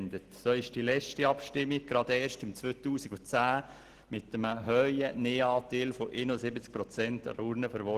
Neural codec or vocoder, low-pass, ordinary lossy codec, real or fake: none; 14.4 kHz; Opus, 16 kbps; real